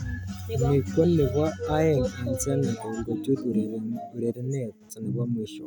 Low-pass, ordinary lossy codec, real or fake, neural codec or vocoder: none; none; real; none